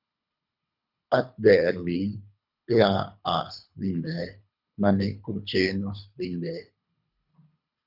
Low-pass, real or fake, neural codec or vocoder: 5.4 kHz; fake; codec, 24 kHz, 3 kbps, HILCodec